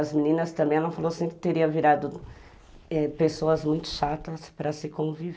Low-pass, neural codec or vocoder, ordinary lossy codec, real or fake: none; none; none; real